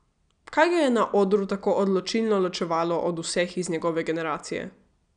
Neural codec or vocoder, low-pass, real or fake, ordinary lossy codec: none; 9.9 kHz; real; none